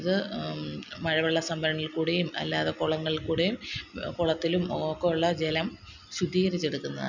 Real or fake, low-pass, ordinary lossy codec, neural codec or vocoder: real; 7.2 kHz; none; none